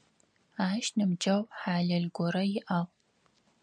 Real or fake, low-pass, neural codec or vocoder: real; 9.9 kHz; none